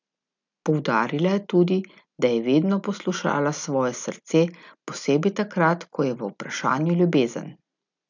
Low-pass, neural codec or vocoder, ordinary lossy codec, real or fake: 7.2 kHz; none; none; real